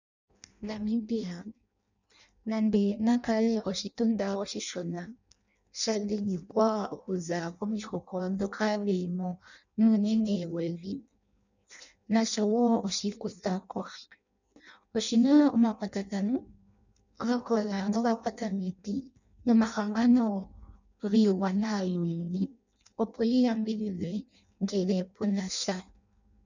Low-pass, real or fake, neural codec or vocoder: 7.2 kHz; fake; codec, 16 kHz in and 24 kHz out, 0.6 kbps, FireRedTTS-2 codec